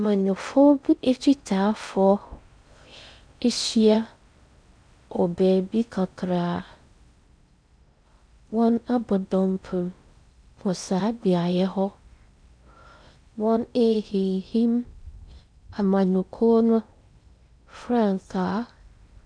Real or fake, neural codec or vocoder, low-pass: fake; codec, 16 kHz in and 24 kHz out, 0.6 kbps, FocalCodec, streaming, 4096 codes; 9.9 kHz